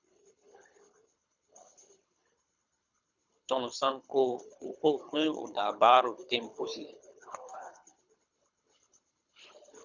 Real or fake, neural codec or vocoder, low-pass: fake; codec, 24 kHz, 3 kbps, HILCodec; 7.2 kHz